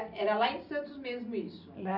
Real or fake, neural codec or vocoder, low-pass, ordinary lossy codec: real; none; 5.4 kHz; none